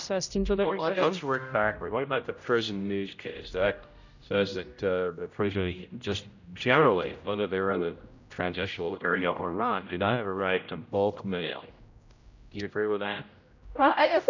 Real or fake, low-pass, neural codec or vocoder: fake; 7.2 kHz; codec, 16 kHz, 0.5 kbps, X-Codec, HuBERT features, trained on general audio